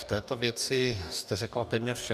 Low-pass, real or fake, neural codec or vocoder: 14.4 kHz; fake; codec, 44.1 kHz, 2.6 kbps, DAC